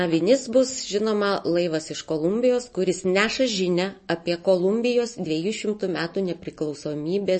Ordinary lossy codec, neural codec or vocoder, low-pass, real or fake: MP3, 32 kbps; none; 10.8 kHz; real